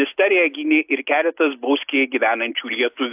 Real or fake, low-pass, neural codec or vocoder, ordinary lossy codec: real; 3.6 kHz; none; AAC, 32 kbps